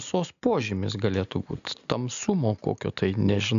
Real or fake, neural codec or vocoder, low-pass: real; none; 7.2 kHz